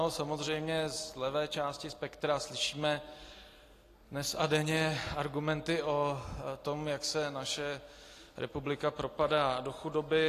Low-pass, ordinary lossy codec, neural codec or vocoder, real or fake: 14.4 kHz; AAC, 48 kbps; none; real